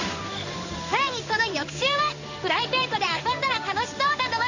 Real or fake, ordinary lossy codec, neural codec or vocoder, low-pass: fake; none; codec, 16 kHz in and 24 kHz out, 1 kbps, XY-Tokenizer; 7.2 kHz